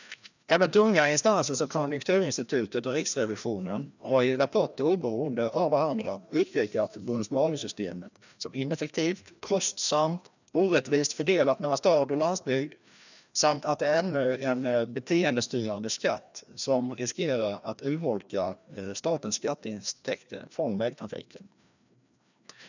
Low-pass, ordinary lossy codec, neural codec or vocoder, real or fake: 7.2 kHz; none; codec, 16 kHz, 1 kbps, FreqCodec, larger model; fake